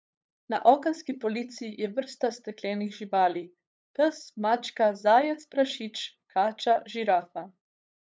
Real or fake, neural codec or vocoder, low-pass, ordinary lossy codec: fake; codec, 16 kHz, 8 kbps, FunCodec, trained on LibriTTS, 25 frames a second; none; none